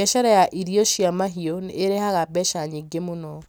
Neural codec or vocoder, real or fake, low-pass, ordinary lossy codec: none; real; none; none